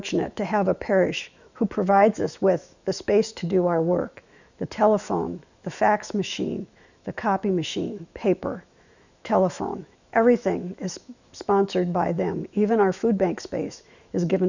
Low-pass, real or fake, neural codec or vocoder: 7.2 kHz; fake; autoencoder, 48 kHz, 128 numbers a frame, DAC-VAE, trained on Japanese speech